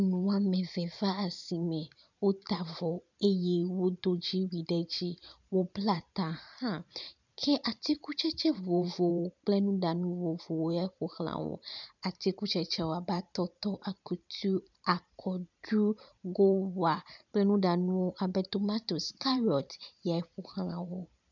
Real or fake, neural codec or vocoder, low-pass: fake; vocoder, 44.1 kHz, 128 mel bands every 512 samples, BigVGAN v2; 7.2 kHz